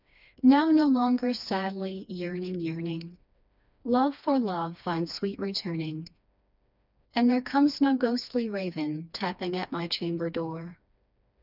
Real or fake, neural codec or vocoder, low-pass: fake; codec, 16 kHz, 2 kbps, FreqCodec, smaller model; 5.4 kHz